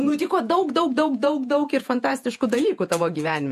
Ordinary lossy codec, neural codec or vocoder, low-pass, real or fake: MP3, 64 kbps; vocoder, 48 kHz, 128 mel bands, Vocos; 14.4 kHz; fake